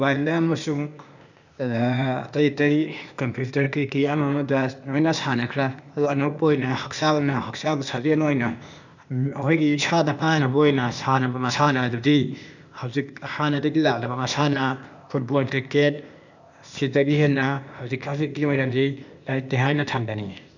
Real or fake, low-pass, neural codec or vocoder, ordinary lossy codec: fake; 7.2 kHz; codec, 16 kHz, 0.8 kbps, ZipCodec; none